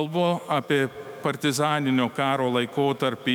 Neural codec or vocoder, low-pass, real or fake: autoencoder, 48 kHz, 128 numbers a frame, DAC-VAE, trained on Japanese speech; 19.8 kHz; fake